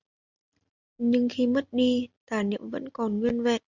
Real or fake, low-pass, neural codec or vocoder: real; 7.2 kHz; none